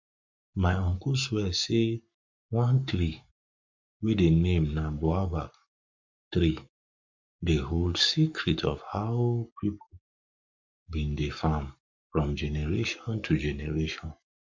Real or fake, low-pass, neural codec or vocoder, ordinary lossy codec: fake; 7.2 kHz; codec, 44.1 kHz, 7.8 kbps, Pupu-Codec; MP3, 48 kbps